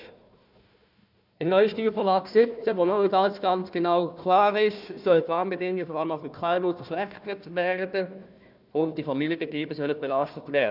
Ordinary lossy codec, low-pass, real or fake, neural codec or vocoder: none; 5.4 kHz; fake; codec, 16 kHz, 1 kbps, FunCodec, trained on Chinese and English, 50 frames a second